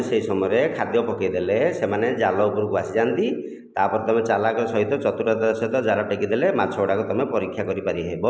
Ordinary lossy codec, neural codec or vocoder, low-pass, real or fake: none; none; none; real